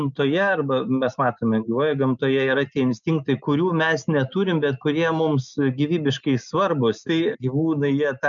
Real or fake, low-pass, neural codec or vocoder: real; 7.2 kHz; none